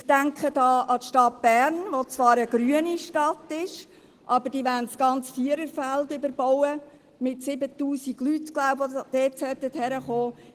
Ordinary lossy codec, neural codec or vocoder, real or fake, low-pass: Opus, 16 kbps; none; real; 14.4 kHz